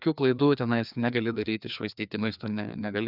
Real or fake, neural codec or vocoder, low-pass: fake; codec, 16 kHz, 2 kbps, FreqCodec, larger model; 5.4 kHz